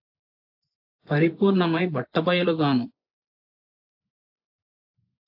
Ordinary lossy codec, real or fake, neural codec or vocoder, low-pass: AAC, 48 kbps; fake; vocoder, 24 kHz, 100 mel bands, Vocos; 5.4 kHz